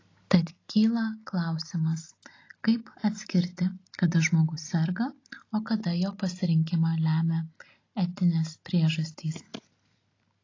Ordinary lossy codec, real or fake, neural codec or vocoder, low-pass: AAC, 32 kbps; real; none; 7.2 kHz